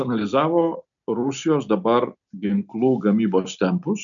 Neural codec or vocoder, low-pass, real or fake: none; 7.2 kHz; real